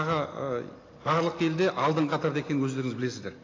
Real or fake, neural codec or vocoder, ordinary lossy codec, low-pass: real; none; AAC, 32 kbps; 7.2 kHz